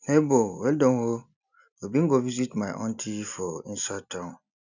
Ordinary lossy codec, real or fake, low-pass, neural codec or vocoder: none; real; 7.2 kHz; none